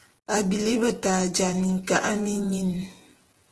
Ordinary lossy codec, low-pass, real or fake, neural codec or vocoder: Opus, 16 kbps; 10.8 kHz; fake; vocoder, 48 kHz, 128 mel bands, Vocos